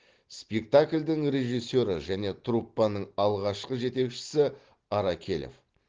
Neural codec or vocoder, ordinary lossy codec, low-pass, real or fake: none; Opus, 16 kbps; 7.2 kHz; real